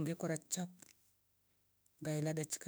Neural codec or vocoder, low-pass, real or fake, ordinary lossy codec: autoencoder, 48 kHz, 32 numbers a frame, DAC-VAE, trained on Japanese speech; none; fake; none